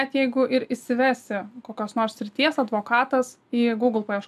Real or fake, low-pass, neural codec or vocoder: real; 14.4 kHz; none